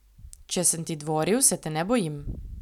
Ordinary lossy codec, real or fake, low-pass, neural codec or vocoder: none; real; 19.8 kHz; none